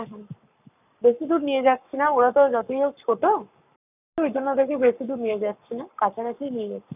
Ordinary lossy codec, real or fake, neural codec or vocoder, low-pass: none; fake; codec, 44.1 kHz, 7.8 kbps, Pupu-Codec; 3.6 kHz